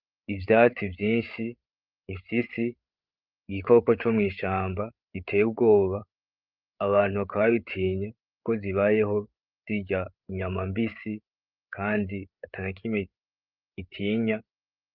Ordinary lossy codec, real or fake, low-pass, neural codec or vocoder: Opus, 24 kbps; fake; 5.4 kHz; codec, 16 kHz, 8 kbps, FreqCodec, larger model